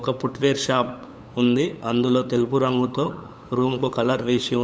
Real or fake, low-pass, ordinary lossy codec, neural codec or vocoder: fake; none; none; codec, 16 kHz, 8 kbps, FunCodec, trained on LibriTTS, 25 frames a second